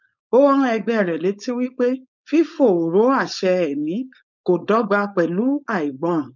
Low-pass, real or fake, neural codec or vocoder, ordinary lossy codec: 7.2 kHz; fake; codec, 16 kHz, 4.8 kbps, FACodec; none